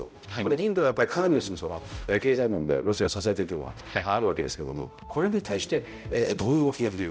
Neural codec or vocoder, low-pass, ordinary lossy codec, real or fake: codec, 16 kHz, 0.5 kbps, X-Codec, HuBERT features, trained on balanced general audio; none; none; fake